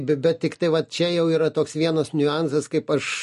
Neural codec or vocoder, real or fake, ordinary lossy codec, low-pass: none; real; MP3, 48 kbps; 14.4 kHz